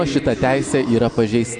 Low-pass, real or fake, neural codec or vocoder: 9.9 kHz; real; none